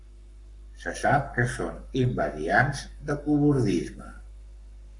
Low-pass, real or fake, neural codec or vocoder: 10.8 kHz; fake; codec, 44.1 kHz, 7.8 kbps, Pupu-Codec